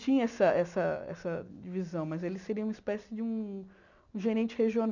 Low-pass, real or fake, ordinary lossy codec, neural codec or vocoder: 7.2 kHz; real; none; none